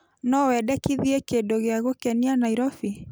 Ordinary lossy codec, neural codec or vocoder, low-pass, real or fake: none; none; none; real